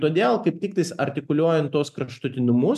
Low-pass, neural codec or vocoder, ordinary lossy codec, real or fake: 14.4 kHz; none; MP3, 96 kbps; real